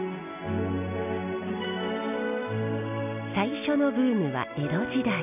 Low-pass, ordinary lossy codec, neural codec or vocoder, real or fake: 3.6 kHz; none; none; real